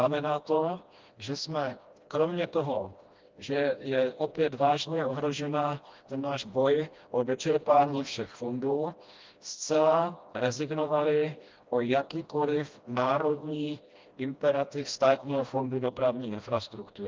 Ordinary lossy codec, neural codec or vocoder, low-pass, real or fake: Opus, 24 kbps; codec, 16 kHz, 1 kbps, FreqCodec, smaller model; 7.2 kHz; fake